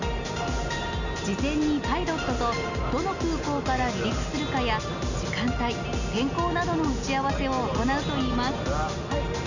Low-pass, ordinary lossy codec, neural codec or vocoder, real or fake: 7.2 kHz; none; none; real